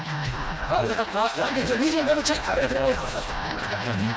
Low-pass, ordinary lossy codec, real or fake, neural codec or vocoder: none; none; fake; codec, 16 kHz, 1 kbps, FreqCodec, smaller model